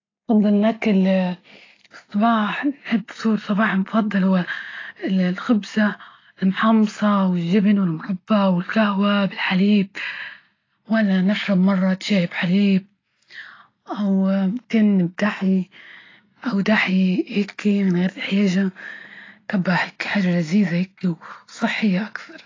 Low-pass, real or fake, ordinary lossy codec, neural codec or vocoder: 7.2 kHz; real; AAC, 32 kbps; none